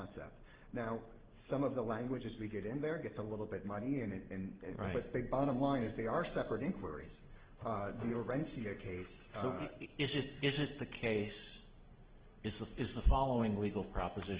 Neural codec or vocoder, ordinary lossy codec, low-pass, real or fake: none; Opus, 16 kbps; 3.6 kHz; real